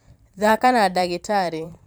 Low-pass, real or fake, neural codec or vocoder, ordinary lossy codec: none; fake; vocoder, 44.1 kHz, 128 mel bands every 512 samples, BigVGAN v2; none